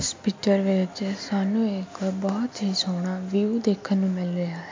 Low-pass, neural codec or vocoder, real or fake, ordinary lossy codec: 7.2 kHz; none; real; MP3, 48 kbps